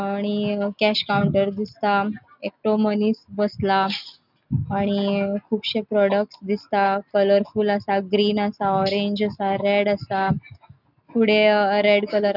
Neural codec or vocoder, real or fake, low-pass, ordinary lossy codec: none; real; 5.4 kHz; none